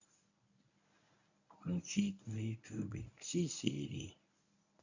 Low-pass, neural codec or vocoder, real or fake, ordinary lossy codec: 7.2 kHz; codec, 24 kHz, 0.9 kbps, WavTokenizer, medium speech release version 1; fake; none